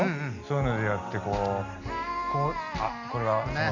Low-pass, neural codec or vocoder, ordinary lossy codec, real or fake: 7.2 kHz; none; none; real